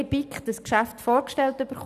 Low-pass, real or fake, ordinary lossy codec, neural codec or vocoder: 14.4 kHz; real; none; none